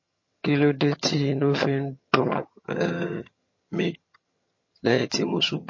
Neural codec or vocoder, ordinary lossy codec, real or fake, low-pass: vocoder, 22.05 kHz, 80 mel bands, HiFi-GAN; MP3, 32 kbps; fake; 7.2 kHz